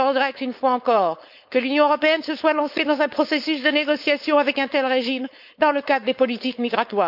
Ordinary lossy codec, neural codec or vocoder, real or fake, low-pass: none; codec, 16 kHz, 4.8 kbps, FACodec; fake; 5.4 kHz